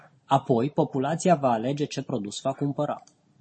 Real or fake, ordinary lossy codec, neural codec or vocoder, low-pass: real; MP3, 32 kbps; none; 9.9 kHz